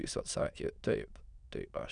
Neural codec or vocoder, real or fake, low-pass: autoencoder, 22.05 kHz, a latent of 192 numbers a frame, VITS, trained on many speakers; fake; 9.9 kHz